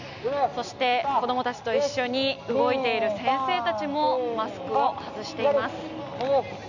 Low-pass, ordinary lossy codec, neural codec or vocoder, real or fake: 7.2 kHz; none; none; real